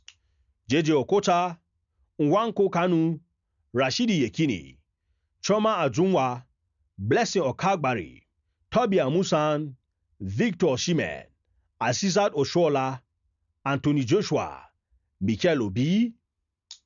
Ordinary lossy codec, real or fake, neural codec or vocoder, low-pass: none; real; none; 7.2 kHz